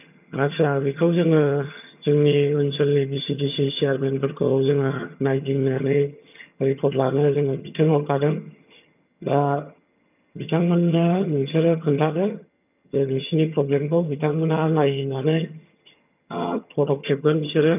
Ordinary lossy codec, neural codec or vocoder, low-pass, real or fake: none; vocoder, 22.05 kHz, 80 mel bands, HiFi-GAN; 3.6 kHz; fake